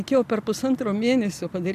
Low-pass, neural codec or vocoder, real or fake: 14.4 kHz; none; real